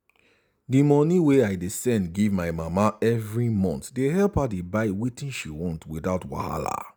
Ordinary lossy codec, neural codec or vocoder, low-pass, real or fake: none; none; none; real